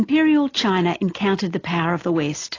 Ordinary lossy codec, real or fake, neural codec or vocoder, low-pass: AAC, 32 kbps; real; none; 7.2 kHz